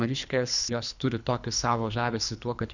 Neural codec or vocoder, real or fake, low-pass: codec, 24 kHz, 3 kbps, HILCodec; fake; 7.2 kHz